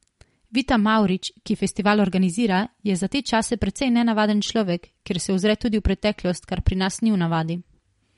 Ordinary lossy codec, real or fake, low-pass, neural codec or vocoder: MP3, 48 kbps; real; 10.8 kHz; none